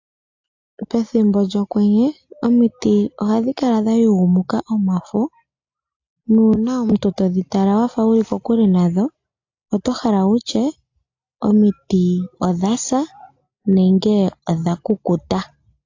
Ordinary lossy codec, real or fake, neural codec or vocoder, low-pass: AAC, 48 kbps; real; none; 7.2 kHz